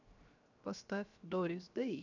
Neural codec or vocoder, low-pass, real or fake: codec, 16 kHz, 0.7 kbps, FocalCodec; 7.2 kHz; fake